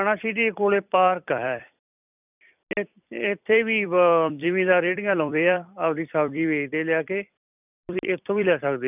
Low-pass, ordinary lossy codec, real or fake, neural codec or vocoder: 3.6 kHz; none; fake; vocoder, 44.1 kHz, 128 mel bands every 256 samples, BigVGAN v2